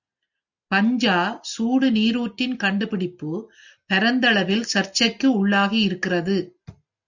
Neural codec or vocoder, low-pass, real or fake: none; 7.2 kHz; real